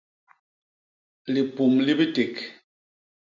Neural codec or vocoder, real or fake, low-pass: none; real; 7.2 kHz